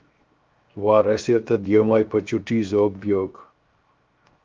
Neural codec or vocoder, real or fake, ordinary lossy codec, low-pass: codec, 16 kHz, 0.7 kbps, FocalCodec; fake; Opus, 24 kbps; 7.2 kHz